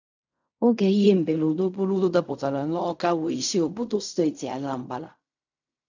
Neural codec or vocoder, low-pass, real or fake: codec, 16 kHz in and 24 kHz out, 0.4 kbps, LongCat-Audio-Codec, fine tuned four codebook decoder; 7.2 kHz; fake